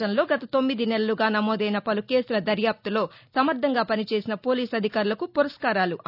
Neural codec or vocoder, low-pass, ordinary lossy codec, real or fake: none; 5.4 kHz; none; real